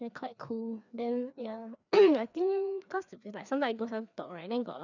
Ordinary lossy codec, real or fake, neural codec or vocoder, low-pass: none; fake; codec, 16 kHz, 2 kbps, FreqCodec, larger model; 7.2 kHz